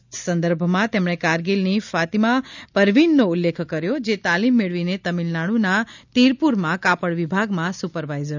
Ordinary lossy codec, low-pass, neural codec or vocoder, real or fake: none; 7.2 kHz; none; real